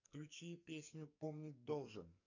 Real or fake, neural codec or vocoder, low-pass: fake; codec, 44.1 kHz, 2.6 kbps, SNAC; 7.2 kHz